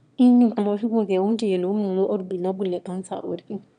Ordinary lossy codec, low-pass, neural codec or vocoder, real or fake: none; 9.9 kHz; autoencoder, 22.05 kHz, a latent of 192 numbers a frame, VITS, trained on one speaker; fake